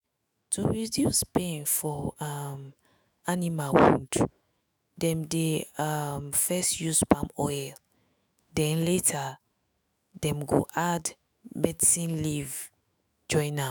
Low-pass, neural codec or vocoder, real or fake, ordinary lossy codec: none; autoencoder, 48 kHz, 128 numbers a frame, DAC-VAE, trained on Japanese speech; fake; none